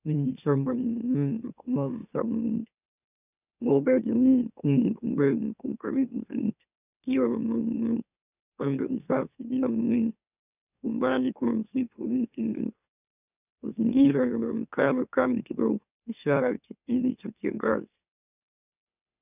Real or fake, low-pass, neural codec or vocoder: fake; 3.6 kHz; autoencoder, 44.1 kHz, a latent of 192 numbers a frame, MeloTTS